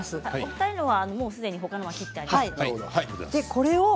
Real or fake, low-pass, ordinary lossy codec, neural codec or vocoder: real; none; none; none